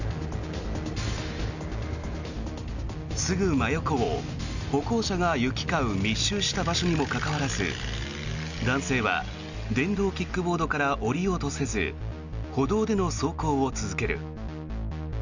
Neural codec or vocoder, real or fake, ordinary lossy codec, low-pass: none; real; none; 7.2 kHz